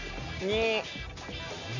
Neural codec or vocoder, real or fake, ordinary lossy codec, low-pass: none; real; none; 7.2 kHz